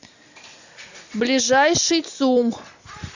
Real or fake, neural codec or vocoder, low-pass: real; none; 7.2 kHz